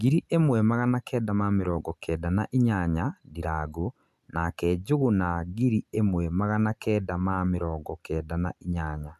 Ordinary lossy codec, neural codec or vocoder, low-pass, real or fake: none; none; 14.4 kHz; real